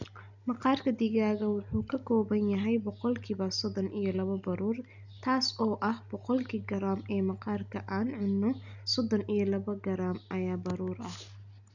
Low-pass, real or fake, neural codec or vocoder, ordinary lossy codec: 7.2 kHz; real; none; none